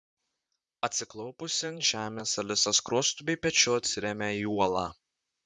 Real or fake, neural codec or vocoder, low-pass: fake; vocoder, 44.1 kHz, 128 mel bands, Pupu-Vocoder; 10.8 kHz